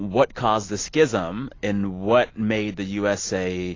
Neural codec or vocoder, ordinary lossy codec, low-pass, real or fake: none; AAC, 32 kbps; 7.2 kHz; real